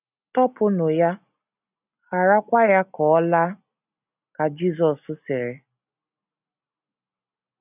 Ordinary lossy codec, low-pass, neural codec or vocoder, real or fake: none; 3.6 kHz; none; real